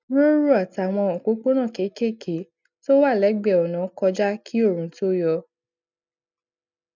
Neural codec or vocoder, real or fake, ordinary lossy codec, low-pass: none; real; none; 7.2 kHz